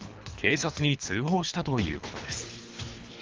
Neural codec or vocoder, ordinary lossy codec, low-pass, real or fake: codec, 24 kHz, 3 kbps, HILCodec; Opus, 32 kbps; 7.2 kHz; fake